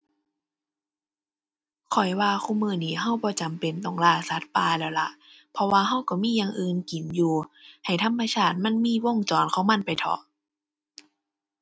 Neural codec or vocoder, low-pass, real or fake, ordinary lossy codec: none; none; real; none